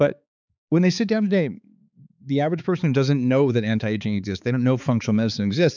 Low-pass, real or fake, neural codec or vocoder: 7.2 kHz; fake; codec, 16 kHz, 4 kbps, X-Codec, HuBERT features, trained on balanced general audio